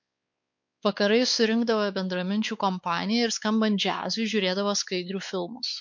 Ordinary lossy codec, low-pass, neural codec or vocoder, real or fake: MP3, 48 kbps; 7.2 kHz; codec, 16 kHz, 4 kbps, X-Codec, WavLM features, trained on Multilingual LibriSpeech; fake